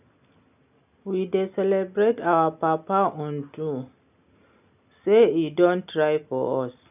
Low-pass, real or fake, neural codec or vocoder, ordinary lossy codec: 3.6 kHz; real; none; none